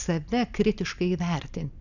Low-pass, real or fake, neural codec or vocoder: 7.2 kHz; real; none